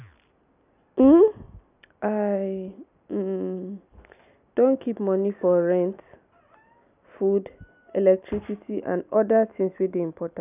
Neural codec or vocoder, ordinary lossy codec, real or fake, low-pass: none; none; real; 3.6 kHz